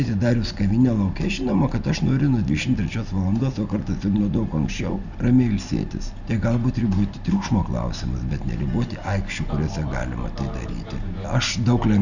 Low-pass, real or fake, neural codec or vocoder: 7.2 kHz; real; none